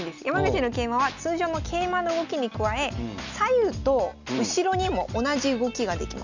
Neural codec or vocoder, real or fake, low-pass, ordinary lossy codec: none; real; 7.2 kHz; none